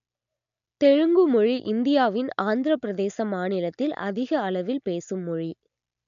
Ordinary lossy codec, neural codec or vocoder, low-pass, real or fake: none; none; 7.2 kHz; real